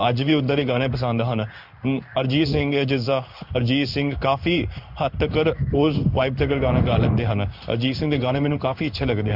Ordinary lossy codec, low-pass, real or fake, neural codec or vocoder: none; 5.4 kHz; fake; codec, 16 kHz in and 24 kHz out, 1 kbps, XY-Tokenizer